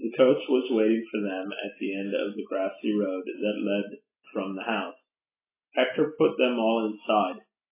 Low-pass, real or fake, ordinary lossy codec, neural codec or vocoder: 3.6 kHz; real; AAC, 24 kbps; none